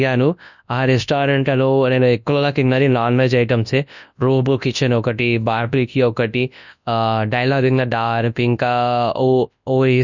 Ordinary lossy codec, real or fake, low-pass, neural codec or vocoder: none; fake; 7.2 kHz; codec, 24 kHz, 0.9 kbps, WavTokenizer, large speech release